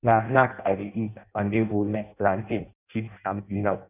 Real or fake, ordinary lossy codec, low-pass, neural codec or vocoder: fake; none; 3.6 kHz; codec, 16 kHz in and 24 kHz out, 0.6 kbps, FireRedTTS-2 codec